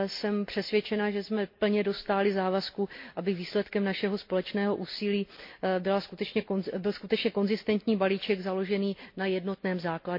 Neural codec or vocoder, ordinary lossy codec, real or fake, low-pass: none; MP3, 32 kbps; real; 5.4 kHz